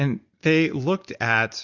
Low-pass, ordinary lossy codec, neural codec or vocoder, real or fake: 7.2 kHz; Opus, 64 kbps; none; real